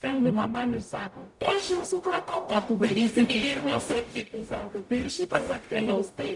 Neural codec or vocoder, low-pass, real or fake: codec, 44.1 kHz, 0.9 kbps, DAC; 10.8 kHz; fake